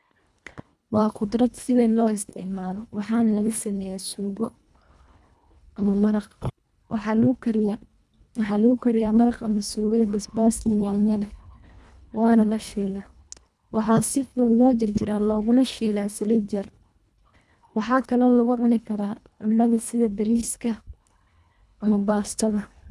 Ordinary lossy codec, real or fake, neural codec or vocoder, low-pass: none; fake; codec, 24 kHz, 1.5 kbps, HILCodec; none